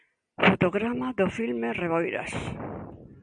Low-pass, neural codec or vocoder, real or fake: 9.9 kHz; none; real